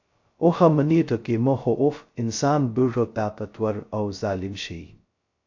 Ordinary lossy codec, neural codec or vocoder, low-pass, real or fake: AAC, 48 kbps; codec, 16 kHz, 0.2 kbps, FocalCodec; 7.2 kHz; fake